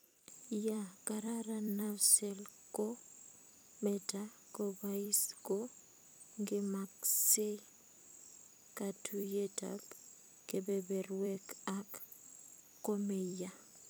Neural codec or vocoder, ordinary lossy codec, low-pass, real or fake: vocoder, 44.1 kHz, 128 mel bands every 256 samples, BigVGAN v2; none; none; fake